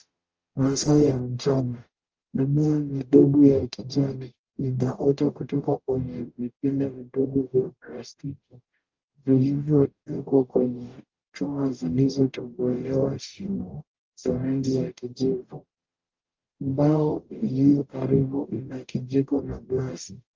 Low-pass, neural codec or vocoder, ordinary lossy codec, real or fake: 7.2 kHz; codec, 44.1 kHz, 0.9 kbps, DAC; Opus, 24 kbps; fake